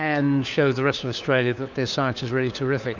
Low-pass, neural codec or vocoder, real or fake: 7.2 kHz; codec, 16 kHz, 4 kbps, FunCodec, trained on LibriTTS, 50 frames a second; fake